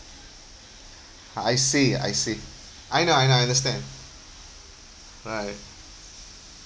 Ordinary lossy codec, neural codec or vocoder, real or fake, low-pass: none; none; real; none